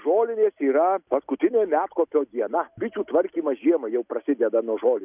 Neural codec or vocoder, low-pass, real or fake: none; 3.6 kHz; real